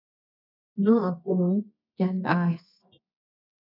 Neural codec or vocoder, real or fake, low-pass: codec, 24 kHz, 0.9 kbps, WavTokenizer, medium music audio release; fake; 5.4 kHz